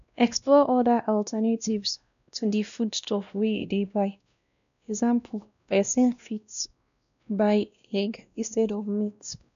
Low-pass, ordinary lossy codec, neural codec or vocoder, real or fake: 7.2 kHz; none; codec, 16 kHz, 1 kbps, X-Codec, WavLM features, trained on Multilingual LibriSpeech; fake